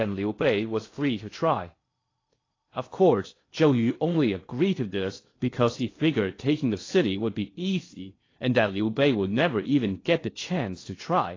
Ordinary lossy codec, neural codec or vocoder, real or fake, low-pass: AAC, 32 kbps; codec, 16 kHz in and 24 kHz out, 0.6 kbps, FocalCodec, streaming, 2048 codes; fake; 7.2 kHz